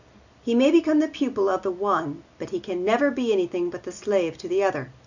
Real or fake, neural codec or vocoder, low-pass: real; none; 7.2 kHz